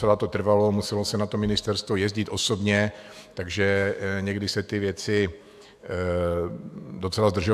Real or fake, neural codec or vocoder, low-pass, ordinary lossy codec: fake; autoencoder, 48 kHz, 128 numbers a frame, DAC-VAE, trained on Japanese speech; 14.4 kHz; AAC, 96 kbps